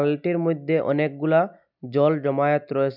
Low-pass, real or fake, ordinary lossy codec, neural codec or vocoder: 5.4 kHz; real; none; none